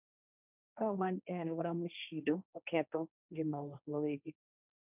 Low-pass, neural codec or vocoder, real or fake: 3.6 kHz; codec, 16 kHz, 1.1 kbps, Voila-Tokenizer; fake